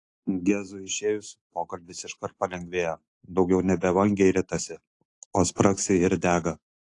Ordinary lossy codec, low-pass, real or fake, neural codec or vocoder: AAC, 48 kbps; 10.8 kHz; real; none